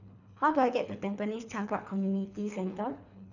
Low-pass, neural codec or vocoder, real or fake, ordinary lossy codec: 7.2 kHz; codec, 24 kHz, 3 kbps, HILCodec; fake; none